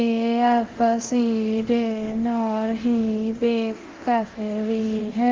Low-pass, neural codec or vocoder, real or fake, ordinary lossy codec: 7.2 kHz; codec, 24 kHz, 0.9 kbps, DualCodec; fake; Opus, 16 kbps